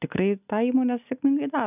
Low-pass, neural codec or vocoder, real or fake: 3.6 kHz; none; real